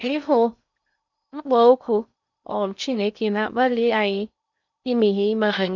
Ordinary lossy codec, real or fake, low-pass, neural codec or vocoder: none; fake; 7.2 kHz; codec, 16 kHz in and 24 kHz out, 0.6 kbps, FocalCodec, streaming, 2048 codes